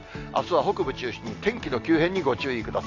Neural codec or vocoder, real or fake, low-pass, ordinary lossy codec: none; real; 7.2 kHz; AAC, 48 kbps